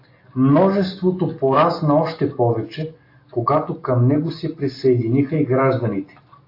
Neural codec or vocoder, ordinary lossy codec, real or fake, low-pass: none; AAC, 32 kbps; real; 5.4 kHz